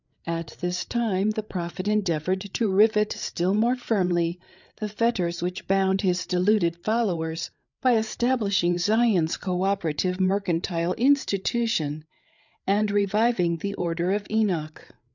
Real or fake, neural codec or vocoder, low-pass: fake; codec, 16 kHz, 8 kbps, FreqCodec, larger model; 7.2 kHz